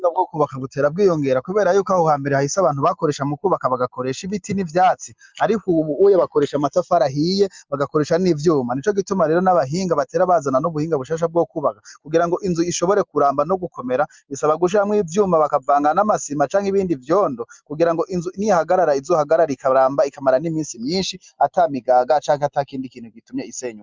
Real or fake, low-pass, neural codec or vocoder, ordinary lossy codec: real; 7.2 kHz; none; Opus, 24 kbps